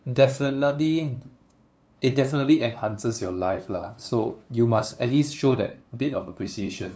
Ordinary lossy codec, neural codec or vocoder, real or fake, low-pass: none; codec, 16 kHz, 2 kbps, FunCodec, trained on LibriTTS, 25 frames a second; fake; none